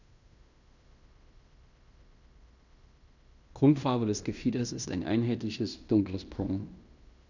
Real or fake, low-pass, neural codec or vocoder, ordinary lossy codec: fake; 7.2 kHz; codec, 16 kHz in and 24 kHz out, 0.9 kbps, LongCat-Audio-Codec, fine tuned four codebook decoder; none